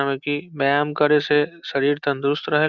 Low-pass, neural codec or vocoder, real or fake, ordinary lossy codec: 7.2 kHz; none; real; Opus, 64 kbps